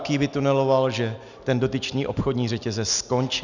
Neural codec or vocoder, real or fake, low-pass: none; real; 7.2 kHz